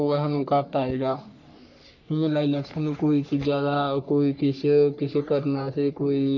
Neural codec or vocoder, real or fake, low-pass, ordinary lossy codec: codec, 44.1 kHz, 3.4 kbps, Pupu-Codec; fake; 7.2 kHz; none